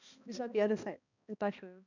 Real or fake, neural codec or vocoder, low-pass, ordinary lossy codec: fake; codec, 16 kHz, 1 kbps, X-Codec, HuBERT features, trained on balanced general audio; 7.2 kHz; none